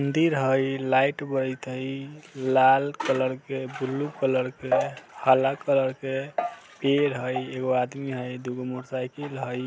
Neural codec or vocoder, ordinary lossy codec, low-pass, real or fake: none; none; none; real